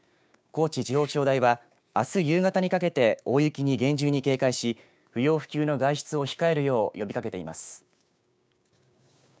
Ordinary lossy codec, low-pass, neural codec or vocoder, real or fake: none; none; codec, 16 kHz, 6 kbps, DAC; fake